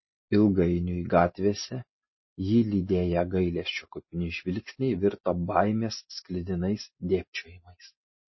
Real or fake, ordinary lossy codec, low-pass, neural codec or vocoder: real; MP3, 24 kbps; 7.2 kHz; none